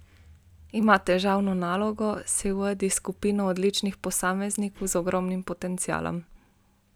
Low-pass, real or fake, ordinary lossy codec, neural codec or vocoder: none; real; none; none